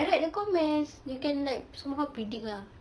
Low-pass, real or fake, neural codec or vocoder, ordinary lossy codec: none; fake; vocoder, 22.05 kHz, 80 mel bands, WaveNeXt; none